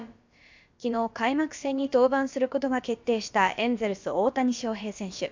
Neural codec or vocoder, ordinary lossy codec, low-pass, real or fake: codec, 16 kHz, about 1 kbps, DyCAST, with the encoder's durations; MP3, 64 kbps; 7.2 kHz; fake